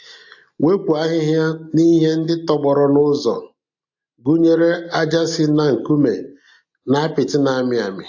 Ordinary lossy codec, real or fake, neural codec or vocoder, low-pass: AAC, 48 kbps; real; none; 7.2 kHz